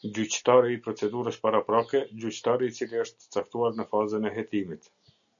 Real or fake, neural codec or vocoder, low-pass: real; none; 7.2 kHz